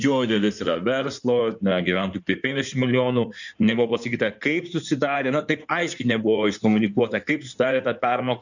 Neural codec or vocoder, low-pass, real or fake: codec, 16 kHz in and 24 kHz out, 2.2 kbps, FireRedTTS-2 codec; 7.2 kHz; fake